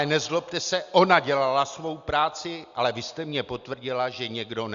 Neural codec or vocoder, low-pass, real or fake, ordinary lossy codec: none; 7.2 kHz; real; Opus, 64 kbps